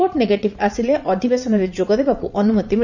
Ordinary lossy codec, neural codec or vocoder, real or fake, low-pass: none; vocoder, 44.1 kHz, 80 mel bands, Vocos; fake; 7.2 kHz